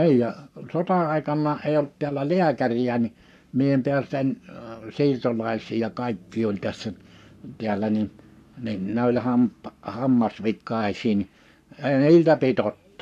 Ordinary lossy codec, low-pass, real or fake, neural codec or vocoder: none; 14.4 kHz; fake; codec, 44.1 kHz, 7.8 kbps, Pupu-Codec